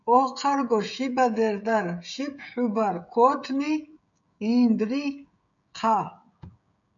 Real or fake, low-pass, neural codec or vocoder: fake; 7.2 kHz; codec, 16 kHz, 16 kbps, FreqCodec, smaller model